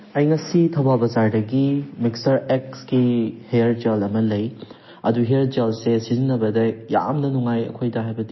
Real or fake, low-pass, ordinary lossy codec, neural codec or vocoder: real; 7.2 kHz; MP3, 24 kbps; none